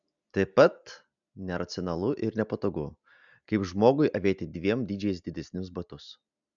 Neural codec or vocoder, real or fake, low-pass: none; real; 7.2 kHz